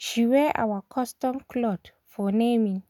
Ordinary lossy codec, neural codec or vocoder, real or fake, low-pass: none; none; real; 19.8 kHz